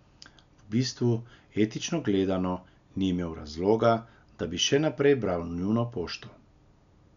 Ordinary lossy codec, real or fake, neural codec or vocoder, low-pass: none; real; none; 7.2 kHz